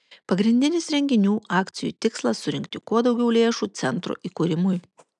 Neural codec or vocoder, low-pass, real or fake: none; 10.8 kHz; real